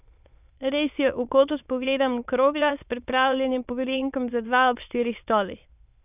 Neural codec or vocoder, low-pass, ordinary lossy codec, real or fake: autoencoder, 22.05 kHz, a latent of 192 numbers a frame, VITS, trained on many speakers; 3.6 kHz; none; fake